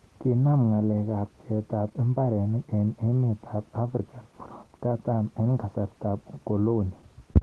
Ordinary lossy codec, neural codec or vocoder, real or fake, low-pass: Opus, 16 kbps; none; real; 10.8 kHz